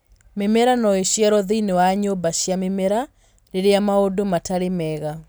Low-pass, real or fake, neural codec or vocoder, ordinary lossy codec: none; real; none; none